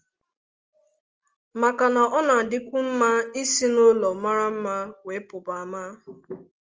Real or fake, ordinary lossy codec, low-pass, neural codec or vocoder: real; Opus, 24 kbps; 7.2 kHz; none